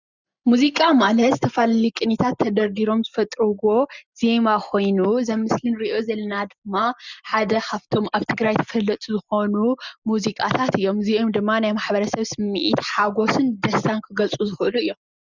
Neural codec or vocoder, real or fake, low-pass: none; real; 7.2 kHz